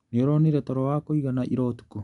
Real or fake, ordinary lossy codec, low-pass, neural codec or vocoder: real; none; 10.8 kHz; none